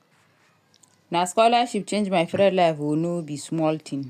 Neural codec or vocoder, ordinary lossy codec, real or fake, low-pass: none; none; real; 14.4 kHz